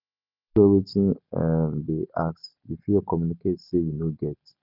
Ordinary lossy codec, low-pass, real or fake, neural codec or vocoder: none; 5.4 kHz; real; none